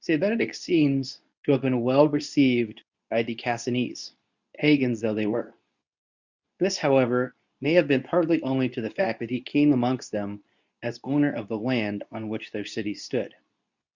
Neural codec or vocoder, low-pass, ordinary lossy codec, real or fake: codec, 24 kHz, 0.9 kbps, WavTokenizer, medium speech release version 2; 7.2 kHz; Opus, 64 kbps; fake